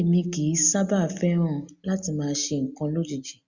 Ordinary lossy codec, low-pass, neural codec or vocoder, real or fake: Opus, 64 kbps; 7.2 kHz; none; real